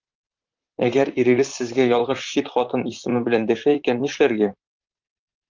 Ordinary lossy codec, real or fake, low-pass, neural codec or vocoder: Opus, 24 kbps; fake; 7.2 kHz; vocoder, 44.1 kHz, 128 mel bands, Pupu-Vocoder